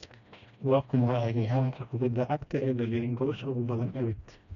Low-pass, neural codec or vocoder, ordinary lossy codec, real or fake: 7.2 kHz; codec, 16 kHz, 1 kbps, FreqCodec, smaller model; none; fake